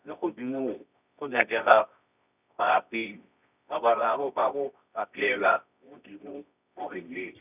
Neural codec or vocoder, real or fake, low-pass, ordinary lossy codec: codec, 24 kHz, 0.9 kbps, WavTokenizer, medium music audio release; fake; 3.6 kHz; none